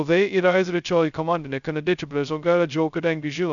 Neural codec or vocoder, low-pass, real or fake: codec, 16 kHz, 0.2 kbps, FocalCodec; 7.2 kHz; fake